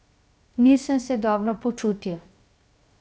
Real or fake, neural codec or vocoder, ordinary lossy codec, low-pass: fake; codec, 16 kHz, 0.7 kbps, FocalCodec; none; none